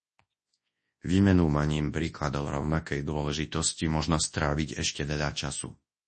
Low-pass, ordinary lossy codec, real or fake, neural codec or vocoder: 10.8 kHz; MP3, 32 kbps; fake; codec, 24 kHz, 0.9 kbps, WavTokenizer, large speech release